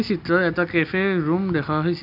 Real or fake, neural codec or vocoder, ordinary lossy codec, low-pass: real; none; none; 5.4 kHz